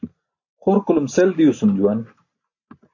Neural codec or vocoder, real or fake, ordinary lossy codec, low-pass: none; real; AAC, 48 kbps; 7.2 kHz